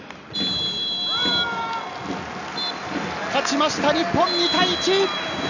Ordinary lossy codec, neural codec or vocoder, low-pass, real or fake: none; none; 7.2 kHz; real